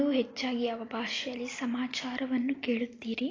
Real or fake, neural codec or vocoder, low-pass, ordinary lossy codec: real; none; 7.2 kHz; AAC, 32 kbps